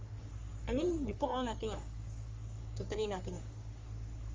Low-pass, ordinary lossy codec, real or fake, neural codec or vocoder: 7.2 kHz; Opus, 32 kbps; fake; codec, 44.1 kHz, 3.4 kbps, Pupu-Codec